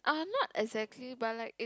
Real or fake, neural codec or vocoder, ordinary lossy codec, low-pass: real; none; none; none